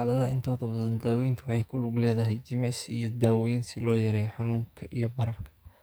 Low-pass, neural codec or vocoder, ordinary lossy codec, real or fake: none; codec, 44.1 kHz, 2.6 kbps, SNAC; none; fake